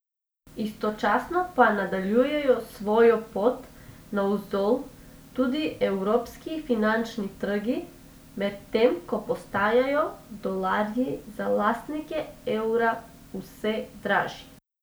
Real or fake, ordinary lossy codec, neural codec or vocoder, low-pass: real; none; none; none